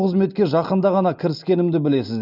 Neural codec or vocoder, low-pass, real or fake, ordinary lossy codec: none; 5.4 kHz; real; none